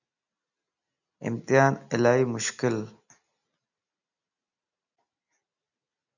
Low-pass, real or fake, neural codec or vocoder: 7.2 kHz; real; none